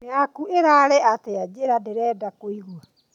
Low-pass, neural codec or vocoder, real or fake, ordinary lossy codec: 19.8 kHz; none; real; none